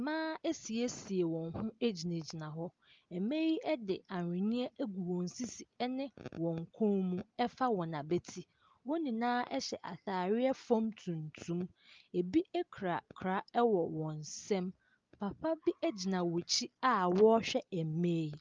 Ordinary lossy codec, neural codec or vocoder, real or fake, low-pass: Opus, 32 kbps; none; real; 7.2 kHz